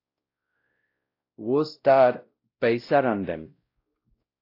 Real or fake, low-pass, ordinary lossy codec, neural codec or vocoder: fake; 5.4 kHz; AAC, 32 kbps; codec, 16 kHz, 0.5 kbps, X-Codec, WavLM features, trained on Multilingual LibriSpeech